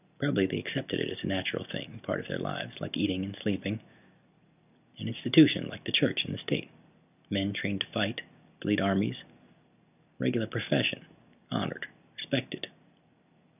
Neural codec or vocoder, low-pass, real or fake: none; 3.6 kHz; real